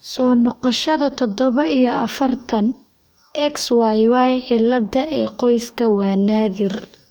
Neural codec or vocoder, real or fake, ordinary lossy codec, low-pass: codec, 44.1 kHz, 2.6 kbps, DAC; fake; none; none